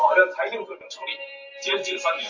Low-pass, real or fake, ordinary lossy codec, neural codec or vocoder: 7.2 kHz; fake; AAC, 48 kbps; vocoder, 44.1 kHz, 128 mel bands every 512 samples, BigVGAN v2